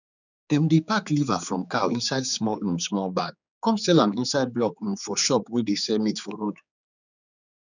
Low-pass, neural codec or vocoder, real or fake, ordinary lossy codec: 7.2 kHz; codec, 16 kHz, 4 kbps, X-Codec, HuBERT features, trained on general audio; fake; none